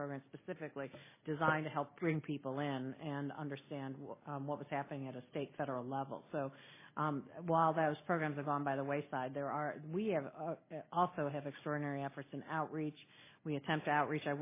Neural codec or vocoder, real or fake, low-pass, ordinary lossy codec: none; real; 3.6 kHz; MP3, 16 kbps